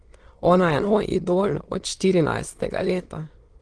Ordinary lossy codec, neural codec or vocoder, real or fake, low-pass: Opus, 16 kbps; autoencoder, 22.05 kHz, a latent of 192 numbers a frame, VITS, trained on many speakers; fake; 9.9 kHz